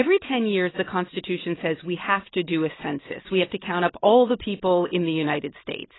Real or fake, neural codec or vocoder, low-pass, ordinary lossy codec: real; none; 7.2 kHz; AAC, 16 kbps